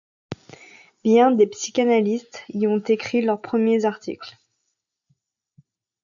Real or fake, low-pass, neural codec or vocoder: real; 7.2 kHz; none